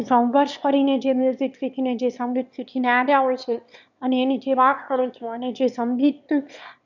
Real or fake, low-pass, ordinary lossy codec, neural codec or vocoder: fake; 7.2 kHz; none; autoencoder, 22.05 kHz, a latent of 192 numbers a frame, VITS, trained on one speaker